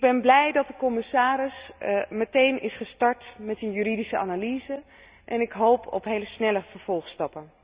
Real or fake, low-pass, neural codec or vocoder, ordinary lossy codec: real; 3.6 kHz; none; Opus, 64 kbps